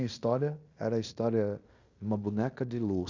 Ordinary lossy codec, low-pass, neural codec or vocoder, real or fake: Opus, 64 kbps; 7.2 kHz; codec, 16 kHz in and 24 kHz out, 0.9 kbps, LongCat-Audio-Codec, fine tuned four codebook decoder; fake